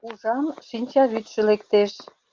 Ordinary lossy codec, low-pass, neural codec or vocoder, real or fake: Opus, 32 kbps; 7.2 kHz; none; real